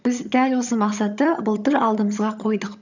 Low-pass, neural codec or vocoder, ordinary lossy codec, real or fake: 7.2 kHz; vocoder, 22.05 kHz, 80 mel bands, HiFi-GAN; none; fake